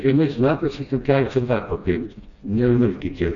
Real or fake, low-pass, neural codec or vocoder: fake; 7.2 kHz; codec, 16 kHz, 1 kbps, FreqCodec, smaller model